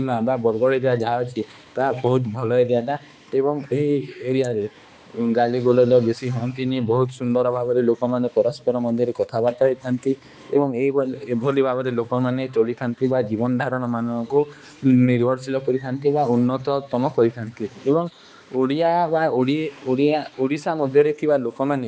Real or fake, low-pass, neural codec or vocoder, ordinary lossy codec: fake; none; codec, 16 kHz, 2 kbps, X-Codec, HuBERT features, trained on balanced general audio; none